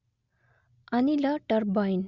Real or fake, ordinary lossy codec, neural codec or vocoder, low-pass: real; Opus, 64 kbps; none; 7.2 kHz